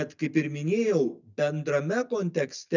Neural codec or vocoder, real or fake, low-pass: none; real; 7.2 kHz